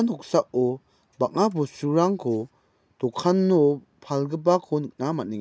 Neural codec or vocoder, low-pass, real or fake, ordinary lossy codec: none; none; real; none